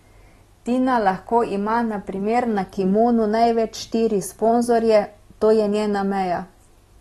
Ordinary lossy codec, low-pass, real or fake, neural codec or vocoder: AAC, 32 kbps; 14.4 kHz; real; none